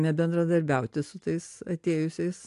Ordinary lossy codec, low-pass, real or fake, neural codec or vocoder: AAC, 64 kbps; 10.8 kHz; real; none